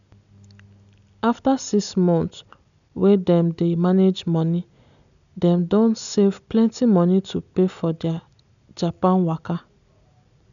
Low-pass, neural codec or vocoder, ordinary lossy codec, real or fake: 7.2 kHz; none; none; real